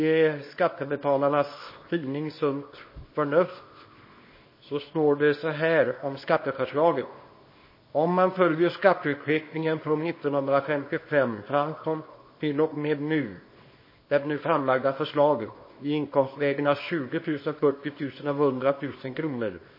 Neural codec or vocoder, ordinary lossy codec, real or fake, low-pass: codec, 24 kHz, 0.9 kbps, WavTokenizer, small release; MP3, 24 kbps; fake; 5.4 kHz